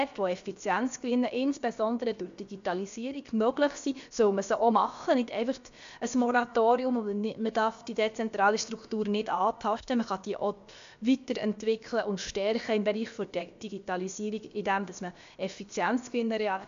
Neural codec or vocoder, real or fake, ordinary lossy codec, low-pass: codec, 16 kHz, about 1 kbps, DyCAST, with the encoder's durations; fake; MP3, 64 kbps; 7.2 kHz